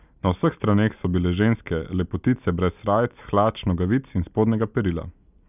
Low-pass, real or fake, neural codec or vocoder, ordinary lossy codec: 3.6 kHz; real; none; none